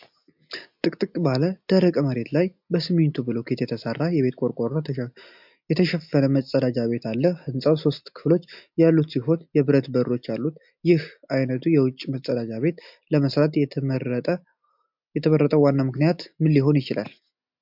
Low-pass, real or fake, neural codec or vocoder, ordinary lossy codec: 5.4 kHz; real; none; MP3, 48 kbps